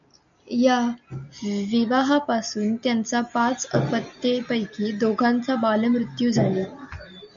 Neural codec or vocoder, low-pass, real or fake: none; 7.2 kHz; real